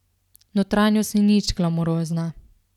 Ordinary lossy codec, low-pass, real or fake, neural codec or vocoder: none; 19.8 kHz; fake; vocoder, 44.1 kHz, 128 mel bands every 256 samples, BigVGAN v2